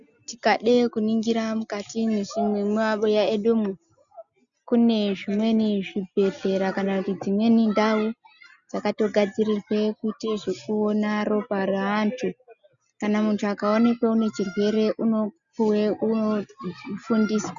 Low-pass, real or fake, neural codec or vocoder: 7.2 kHz; real; none